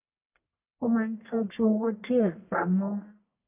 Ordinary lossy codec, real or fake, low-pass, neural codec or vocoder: none; fake; 3.6 kHz; codec, 44.1 kHz, 1.7 kbps, Pupu-Codec